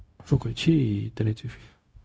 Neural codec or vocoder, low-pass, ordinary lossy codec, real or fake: codec, 16 kHz, 0.4 kbps, LongCat-Audio-Codec; none; none; fake